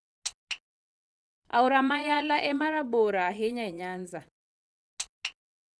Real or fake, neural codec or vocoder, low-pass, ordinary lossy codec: fake; vocoder, 22.05 kHz, 80 mel bands, Vocos; none; none